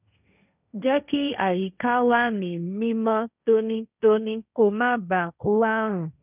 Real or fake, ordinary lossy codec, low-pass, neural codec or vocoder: fake; none; 3.6 kHz; codec, 16 kHz, 1.1 kbps, Voila-Tokenizer